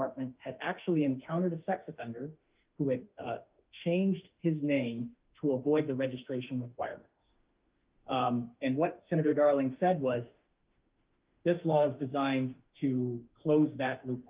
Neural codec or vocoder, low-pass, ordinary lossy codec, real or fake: autoencoder, 48 kHz, 32 numbers a frame, DAC-VAE, trained on Japanese speech; 3.6 kHz; Opus, 32 kbps; fake